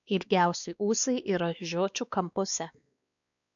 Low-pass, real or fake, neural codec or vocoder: 7.2 kHz; fake; codec, 16 kHz, 2 kbps, X-Codec, WavLM features, trained on Multilingual LibriSpeech